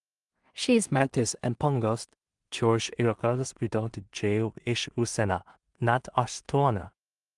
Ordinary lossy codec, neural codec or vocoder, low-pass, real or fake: Opus, 32 kbps; codec, 16 kHz in and 24 kHz out, 0.4 kbps, LongCat-Audio-Codec, two codebook decoder; 10.8 kHz; fake